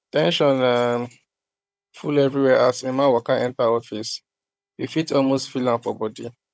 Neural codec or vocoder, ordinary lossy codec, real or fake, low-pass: codec, 16 kHz, 16 kbps, FunCodec, trained on Chinese and English, 50 frames a second; none; fake; none